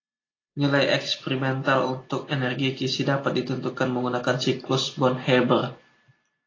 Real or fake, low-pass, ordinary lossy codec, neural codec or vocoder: real; 7.2 kHz; AAC, 32 kbps; none